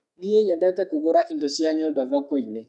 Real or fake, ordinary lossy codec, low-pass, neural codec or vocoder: fake; none; 10.8 kHz; codec, 32 kHz, 1.9 kbps, SNAC